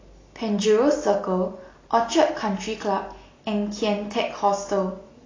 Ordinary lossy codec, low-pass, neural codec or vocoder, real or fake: AAC, 32 kbps; 7.2 kHz; none; real